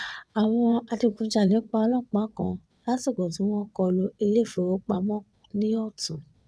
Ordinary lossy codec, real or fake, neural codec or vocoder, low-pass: none; fake; vocoder, 22.05 kHz, 80 mel bands, WaveNeXt; none